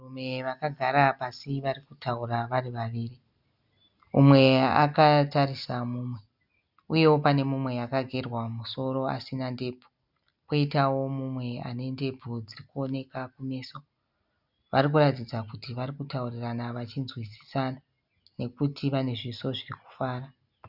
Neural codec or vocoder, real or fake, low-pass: none; real; 5.4 kHz